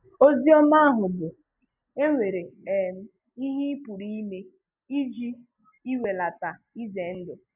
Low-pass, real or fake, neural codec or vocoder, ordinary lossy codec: 3.6 kHz; real; none; none